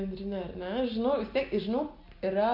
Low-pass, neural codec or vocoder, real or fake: 5.4 kHz; none; real